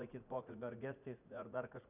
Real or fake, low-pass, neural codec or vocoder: fake; 3.6 kHz; vocoder, 44.1 kHz, 80 mel bands, Vocos